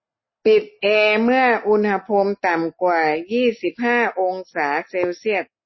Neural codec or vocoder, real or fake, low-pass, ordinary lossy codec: none; real; 7.2 kHz; MP3, 24 kbps